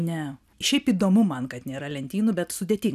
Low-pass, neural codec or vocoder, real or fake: 14.4 kHz; none; real